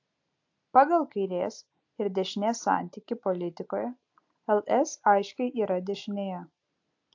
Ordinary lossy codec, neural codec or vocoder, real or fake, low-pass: AAC, 48 kbps; none; real; 7.2 kHz